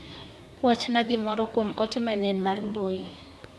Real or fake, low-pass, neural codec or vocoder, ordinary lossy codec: fake; none; codec, 24 kHz, 1 kbps, SNAC; none